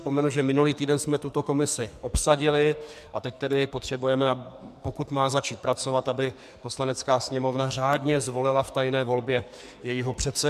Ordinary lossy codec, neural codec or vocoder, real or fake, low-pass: MP3, 96 kbps; codec, 44.1 kHz, 2.6 kbps, SNAC; fake; 14.4 kHz